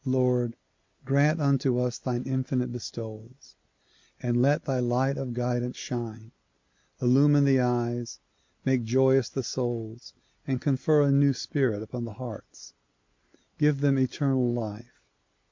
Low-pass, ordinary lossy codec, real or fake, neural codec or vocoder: 7.2 kHz; MP3, 48 kbps; real; none